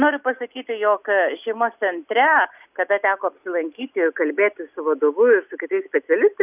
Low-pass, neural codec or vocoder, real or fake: 3.6 kHz; none; real